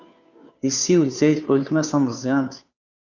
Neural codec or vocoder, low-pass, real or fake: codec, 16 kHz, 2 kbps, FunCodec, trained on Chinese and English, 25 frames a second; 7.2 kHz; fake